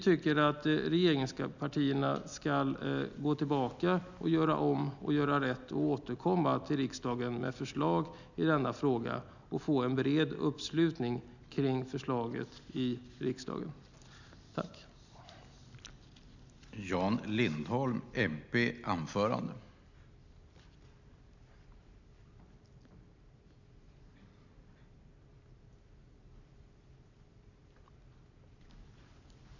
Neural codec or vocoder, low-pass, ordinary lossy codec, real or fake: none; 7.2 kHz; none; real